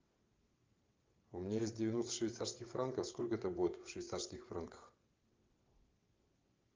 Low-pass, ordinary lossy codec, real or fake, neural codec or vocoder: 7.2 kHz; Opus, 24 kbps; fake; vocoder, 22.05 kHz, 80 mel bands, WaveNeXt